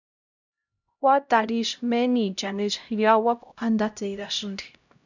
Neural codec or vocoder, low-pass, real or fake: codec, 16 kHz, 0.5 kbps, X-Codec, HuBERT features, trained on LibriSpeech; 7.2 kHz; fake